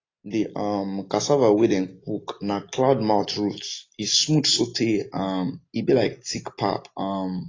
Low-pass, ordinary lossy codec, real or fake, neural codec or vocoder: 7.2 kHz; AAC, 32 kbps; real; none